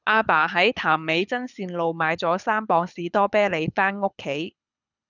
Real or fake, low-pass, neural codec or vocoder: fake; 7.2 kHz; codec, 24 kHz, 6 kbps, HILCodec